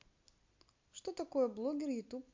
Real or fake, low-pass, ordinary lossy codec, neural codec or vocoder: real; 7.2 kHz; MP3, 48 kbps; none